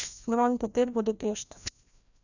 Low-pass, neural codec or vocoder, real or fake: 7.2 kHz; codec, 16 kHz, 1 kbps, FreqCodec, larger model; fake